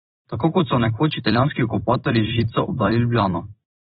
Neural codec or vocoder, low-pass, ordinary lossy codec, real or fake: vocoder, 44.1 kHz, 128 mel bands every 256 samples, BigVGAN v2; 19.8 kHz; AAC, 16 kbps; fake